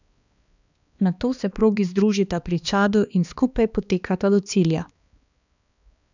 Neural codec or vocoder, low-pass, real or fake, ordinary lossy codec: codec, 16 kHz, 2 kbps, X-Codec, HuBERT features, trained on balanced general audio; 7.2 kHz; fake; none